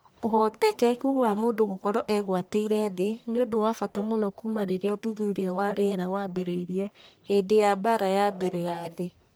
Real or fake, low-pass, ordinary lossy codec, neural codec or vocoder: fake; none; none; codec, 44.1 kHz, 1.7 kbps, Pupu-Codec